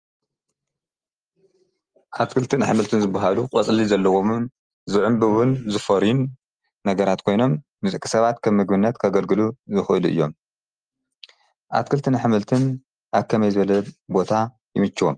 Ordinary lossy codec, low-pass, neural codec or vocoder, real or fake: Opus, 24 kbps; 9.9 kHz; none; real